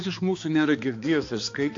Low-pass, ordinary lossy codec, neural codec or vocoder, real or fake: 7.2 kHz; AAC, 48 kbps; codec, 16 kHz, 2 kbps, X-Codec, HuBERT features, trained on balanced general audio; fake